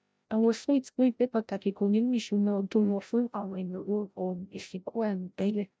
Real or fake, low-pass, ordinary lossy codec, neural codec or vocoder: fake; none; none; codec, 16 kHz, 0.5 kbps, FreqCodec, larger model